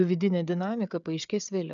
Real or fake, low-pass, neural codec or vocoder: fake; 7.2 kHz; codec, 16 kHz, 16 kbps, FreqCodec, smaller model